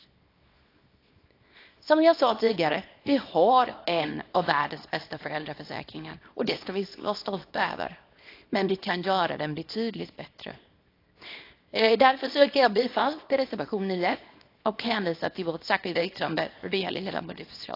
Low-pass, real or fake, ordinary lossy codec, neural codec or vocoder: 5.4 kHz; fake; AAC, 32 kbps; codec, 24 kHz, 0.9 kbps, WavTokenizer, small release